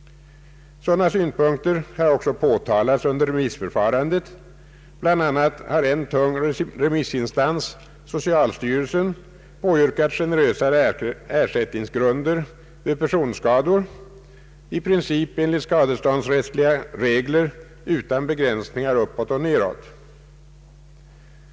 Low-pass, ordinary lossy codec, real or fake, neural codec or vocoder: none; none; real; none